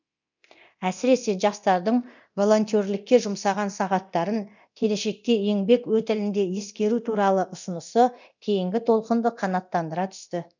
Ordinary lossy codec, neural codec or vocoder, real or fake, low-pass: none; codec, 24 kHz, 0.9 kbps, DualCodec; fake; 7.2 kHz